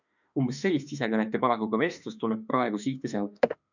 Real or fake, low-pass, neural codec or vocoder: fake; 7.2 kHz; autoencoder, 48 kHz, 32 numbers a frame, DAC-VAE, trained on Japanese speech